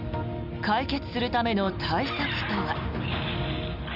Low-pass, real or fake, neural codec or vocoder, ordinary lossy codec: 5.4 kHz; real; none; none